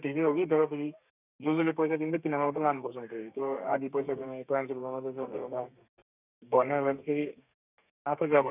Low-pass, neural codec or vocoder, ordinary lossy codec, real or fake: 3.6 kHz; codec, 32 kHz, 1.9 kbps, SNAC; none; fake